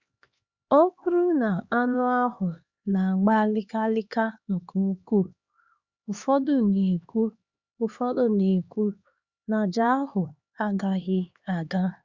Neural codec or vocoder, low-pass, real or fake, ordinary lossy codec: codec, 16 kHz, 2 kbps, X-Codec, HuBERT features, trained on LibriSpeech; 7.2 kHz; fake; Opus, 64 kbps